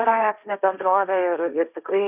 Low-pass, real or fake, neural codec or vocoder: 3.6 kHz; fake; codec, 16 kHz, 1.1 kbps, Voila-Tokenizer